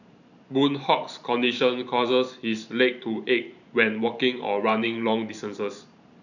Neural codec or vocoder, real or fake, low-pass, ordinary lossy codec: vocoder, 44.1 kHz, 128 mel bands every 512 samples, BigVGAN v2; fake; 7.2 kHz; none